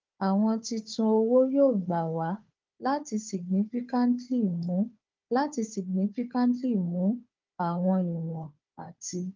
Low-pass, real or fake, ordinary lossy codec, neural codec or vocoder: 7.2 kHz; fake; Opus, 24 kbps; codec, 16 kHz, 4 kbps, FunCodec, trained on Chinese and English, 50 frames a second